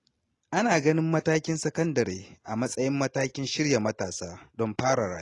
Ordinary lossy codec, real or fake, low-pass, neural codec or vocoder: AAC, 32 kbps; real; 10.8 kHz; none